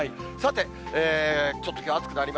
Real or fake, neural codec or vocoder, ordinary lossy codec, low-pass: real; none; none; none